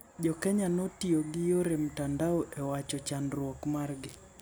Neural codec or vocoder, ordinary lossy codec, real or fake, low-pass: none; none; real; none